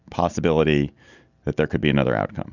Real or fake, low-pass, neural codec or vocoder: real; 7.2 kHz; none